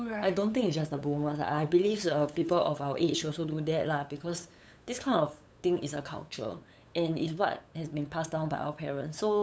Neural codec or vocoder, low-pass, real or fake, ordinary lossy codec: codec, 16 kHz, 8 kbps, FunCodec, trained on LibriTTS, 25 frames a second; none; fake; none